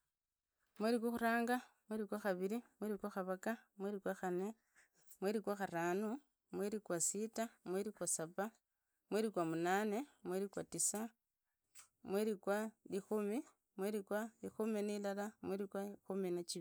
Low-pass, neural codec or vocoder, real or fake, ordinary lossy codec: none; none; real; none